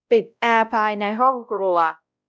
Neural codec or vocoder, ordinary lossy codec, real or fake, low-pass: codec, 16 kHz, 0.5 kbps, X-Codec, WavLM features, trained on Multilingual LibriSpeech; none; fake; none